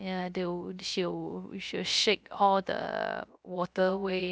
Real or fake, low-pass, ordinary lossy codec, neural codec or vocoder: fake; none; none; codec, 16 kHz, 0.3 kbps, FocalCodec